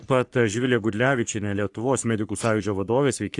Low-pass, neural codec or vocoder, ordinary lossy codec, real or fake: 10.8 kHz; codec, 44.1 kHz, 3.4 kbps, Pupu-Codec; AAC, 64 kbps; fake